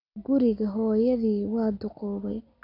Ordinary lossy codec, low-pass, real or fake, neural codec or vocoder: none; 5.4 kHz; real; none